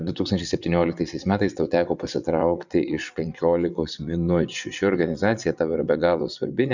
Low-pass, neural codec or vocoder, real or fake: 7.2 kHz; vocoder, 22.05 kHz, 80 mel bands, WaveNeXt; fake